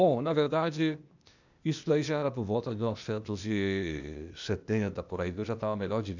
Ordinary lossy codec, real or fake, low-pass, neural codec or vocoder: none; fake; 7.2 kHz; codec, 16 kHz, 0.8 kbps, ZipCodec